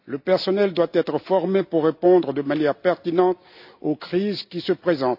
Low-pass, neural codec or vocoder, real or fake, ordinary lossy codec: 5.4 kHz; none; real; AAC, 48 kbps